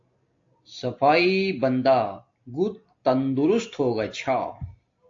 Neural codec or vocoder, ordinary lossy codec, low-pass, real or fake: none; AAC, 48 kbps; 7.2 kHz; real